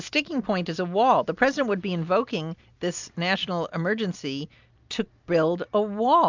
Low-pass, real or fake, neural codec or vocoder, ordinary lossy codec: 7.2 kHz; real; none; MP3, 64 kbps